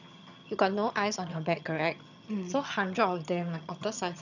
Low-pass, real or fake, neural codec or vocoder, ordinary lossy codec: 7.2 kHz; fake; vocoder, 22.05 kHz, 80 mel bands, HiFi-GAN; none